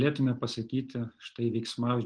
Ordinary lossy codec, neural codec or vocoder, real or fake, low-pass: Opus, 24 kbps; none; real; 9.9 kHz